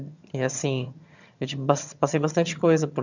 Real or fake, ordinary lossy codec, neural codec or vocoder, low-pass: fake; none; vocoder, 22.05 kHz, 80 mel bands, HiFi-GAN; 7.2 kHz